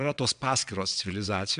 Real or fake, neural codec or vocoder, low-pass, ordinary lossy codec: fake; vocoder, 22.05 kHz, 80 mel bands, WaveNeXt; 9.9 kHz; AAC, 96 kbps